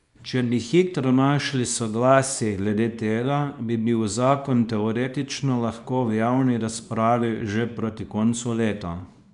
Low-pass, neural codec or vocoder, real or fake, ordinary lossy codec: 10.8 kHz; codec, 24 kHz, 0.9 kbps, WavTokenizer, small release; fake; none